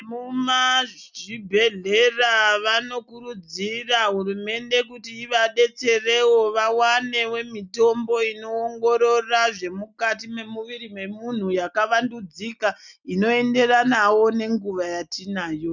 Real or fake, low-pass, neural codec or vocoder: real; 7.2 kHz; none